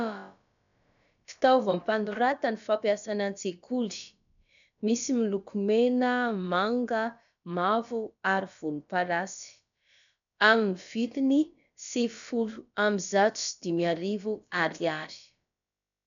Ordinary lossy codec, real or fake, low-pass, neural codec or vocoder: MP3, 96 kbps; fake; 7.2 kHz; codec, 16 kHz, about 1 kbps, DyCAST, with the encoder's durations